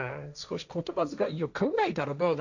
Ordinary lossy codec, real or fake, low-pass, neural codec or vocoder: none; fake; 7.2 kHz; codec, 16 kHz, 1.1 kbps, Voila-Tokenizer